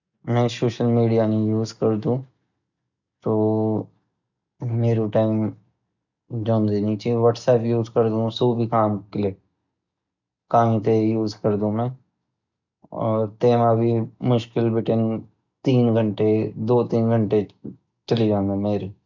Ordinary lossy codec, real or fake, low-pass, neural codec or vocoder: none; real; 7.2 kHz; none